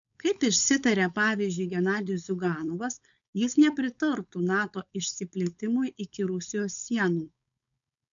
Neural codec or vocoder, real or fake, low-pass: codec, 16 kHz, 4.8 kbps, FACodec; fake; 7.2 kHz